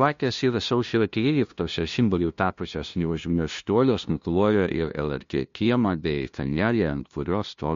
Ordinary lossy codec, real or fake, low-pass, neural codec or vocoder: MP3, 48 kbps; fake; 7.2 kHz; codec, 16 kHz, 0.5 kbps, FunCodec, trained on LibriTTS, 25 frames a second